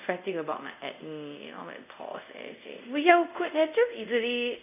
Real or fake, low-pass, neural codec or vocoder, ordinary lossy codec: fake; 3.6 kHz; codec, 24 kHz, 0.5 kbps, DualCodec; none